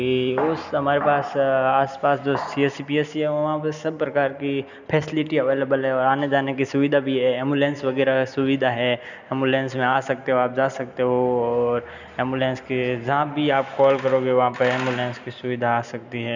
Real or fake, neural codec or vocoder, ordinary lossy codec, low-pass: real; none; none; 7.2 kHz